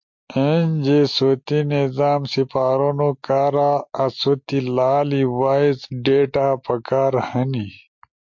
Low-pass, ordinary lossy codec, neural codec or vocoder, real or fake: 7.2 kHz; MP3, 48 kbps; none; real